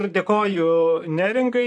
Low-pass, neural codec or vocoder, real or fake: 10.8 kHz; vocoder, 44.1 kHz, 128 mel bands, Pupu-Vocoder; fake